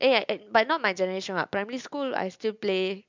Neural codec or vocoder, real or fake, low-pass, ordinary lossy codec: none; real; 7.2 kHz; MP3, 64 kbps